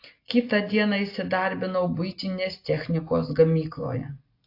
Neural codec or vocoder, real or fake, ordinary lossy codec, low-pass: none; real; AAC, 32 kbps; 5.4 kHz